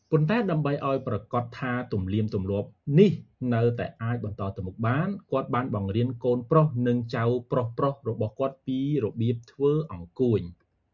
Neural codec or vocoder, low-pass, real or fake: none; 7.2 kHz; real